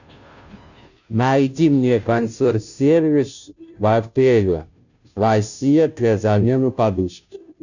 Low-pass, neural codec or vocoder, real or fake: 7.2 kHz; codec, 16 kHz, 0.5 kbps, FunCodec, trained on Chinese and English, 25 frames a second; fake